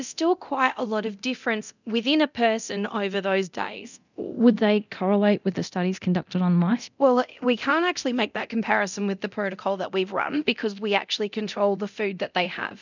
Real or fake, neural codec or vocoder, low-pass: fake; codec, 24 kHz, 0.9 kbps, DualCodec; 7.2 kHz